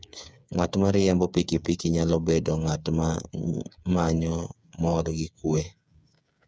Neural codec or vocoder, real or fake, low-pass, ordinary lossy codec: codec, 16 kHz, 8 kbps, FreqCodec, smaller model; fake; none; none